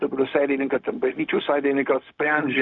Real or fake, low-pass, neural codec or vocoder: fake; 7.2 kHz; codec, 16 kHz, 0.4 kbps, LongCat-Audio-Codec